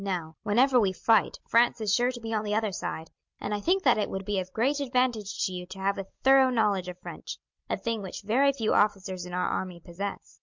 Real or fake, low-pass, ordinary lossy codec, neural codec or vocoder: real; 7.2 kHz; MP3, 64 kbps; none